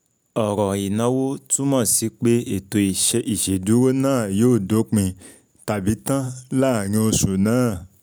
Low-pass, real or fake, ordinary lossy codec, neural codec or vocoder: none; real; none; none